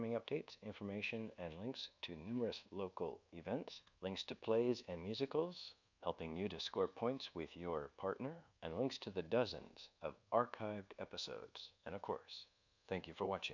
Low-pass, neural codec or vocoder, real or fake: 7.2 kHz; codec, 24 kHz, 1.2 kbps, DualCodec; fake